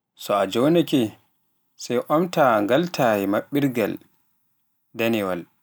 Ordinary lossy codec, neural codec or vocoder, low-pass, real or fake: none; none; none; real